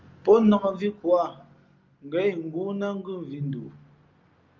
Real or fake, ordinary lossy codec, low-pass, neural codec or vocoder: real; Opus, 32 kbps; 7.2 kHz; none